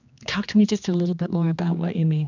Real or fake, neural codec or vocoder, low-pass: fake; codec, 16 kHz, 2 kbps, X-Codec, HuBERT features, trained on general audio; 7.2 kHz